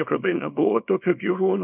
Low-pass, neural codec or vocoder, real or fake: 3.6 kHz; codec, 24 kHz, 0.9 kbps, WavTokenizer, small release; fake